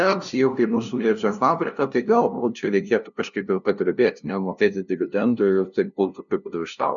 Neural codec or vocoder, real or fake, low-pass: codec, 16 kHz, 0.5 kbps, FunCodec, trained on LibriTTS, 25 frames a second; fake; 7.2 kHz